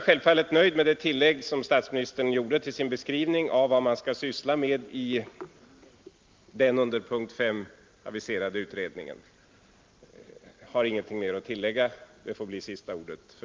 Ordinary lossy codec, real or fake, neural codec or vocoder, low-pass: Opus, 32 kbps; real; none; 7.2 kHz